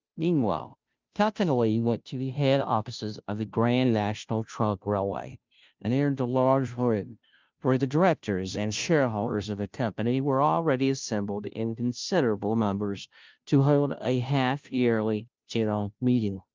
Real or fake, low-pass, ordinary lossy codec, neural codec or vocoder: fake; 7.2 kHz; Opus, 24 kbps; codec, 16 kHz, 0.5 kbps, FunCodec, trained on Chinese and English, 25 frames a second